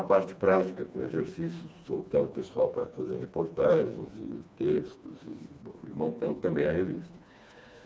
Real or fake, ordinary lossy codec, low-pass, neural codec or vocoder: fake; none; none; codec, 16 kHz, 2 kbps, FreqCodec, smaller model